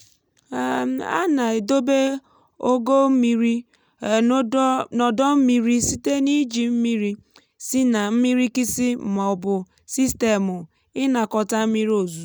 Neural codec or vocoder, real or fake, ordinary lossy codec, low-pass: none; real; none; none